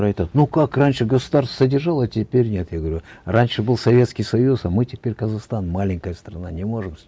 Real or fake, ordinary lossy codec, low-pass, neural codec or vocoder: real; none; none; none